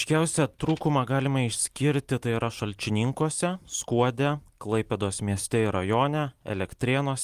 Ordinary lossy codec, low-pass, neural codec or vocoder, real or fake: Opus, 32 kbps; 14.4 kHz; none; real